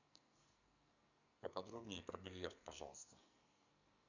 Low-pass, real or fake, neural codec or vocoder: 7.2 kHz; fake; codec, 44.1 kHz, 2.6 kbps, SNAC